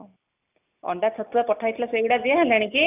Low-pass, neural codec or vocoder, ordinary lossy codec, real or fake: 3.6 kHz; none; none; real